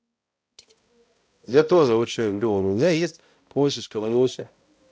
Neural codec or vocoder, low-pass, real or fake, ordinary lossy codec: codec, 16 kHz, 0.5 kbps, X-Codec, HuBERT features, trained on balanced general audio; none; fake; none